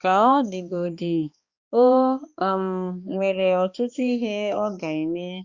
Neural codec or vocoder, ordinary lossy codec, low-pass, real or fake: codec, 16 kHz, 2 kbps, X-Codec, HuBERT features, trained on balanced general audio; Opus, 64 kbps; 7.2 kHz; fake